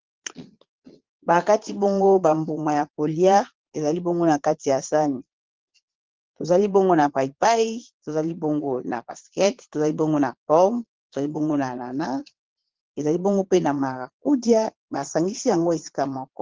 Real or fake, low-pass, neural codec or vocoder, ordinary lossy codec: fake; 7.2 kHz; vocoder, 22.05 kHz, 80 mel bands, Vocos; Opus, 16 kbps